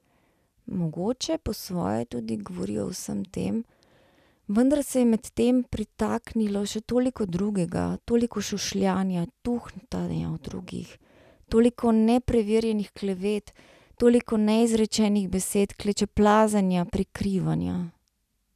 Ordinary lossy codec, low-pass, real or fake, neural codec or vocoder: none; 14.4 kHz; real; none